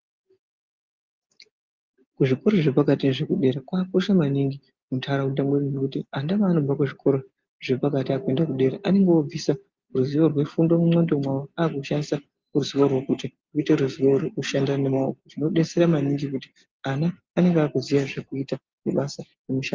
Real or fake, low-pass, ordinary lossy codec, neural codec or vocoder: real; 7.2 kHz; Opus, 32 kbps; none